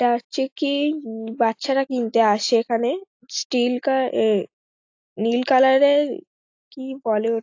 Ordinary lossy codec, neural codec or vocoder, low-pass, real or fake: AAC, 48 kbps; none; 7.2 kHz; real